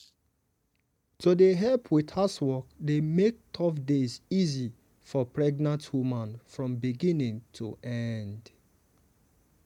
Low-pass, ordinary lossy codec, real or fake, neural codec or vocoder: 19.8 kHz; MP3, 96 kbps; real; none